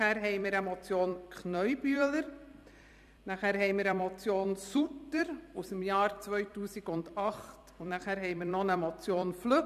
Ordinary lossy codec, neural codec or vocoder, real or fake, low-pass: MP3, 96 kbps; vocoder, 44.1 kHz, 128 mel bands every 256 samples, BigVGAN v2; fake; 14.4 kHz